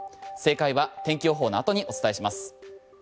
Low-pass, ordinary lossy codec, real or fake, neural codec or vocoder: none; none; real; none